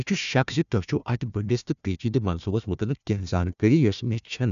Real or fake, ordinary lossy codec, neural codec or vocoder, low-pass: fake; none; codec, 16 kHz, 1 kbps, FunCodec, trained on Chinese and English, 50 frames a second; 7.2 kHz